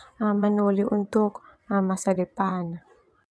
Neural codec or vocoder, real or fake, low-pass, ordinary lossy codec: vocoder, 22.05 kHz, 80 mel bands, WaveNeXt; fake; none; none